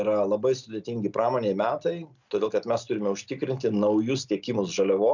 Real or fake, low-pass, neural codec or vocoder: real; 7.2 kHz; none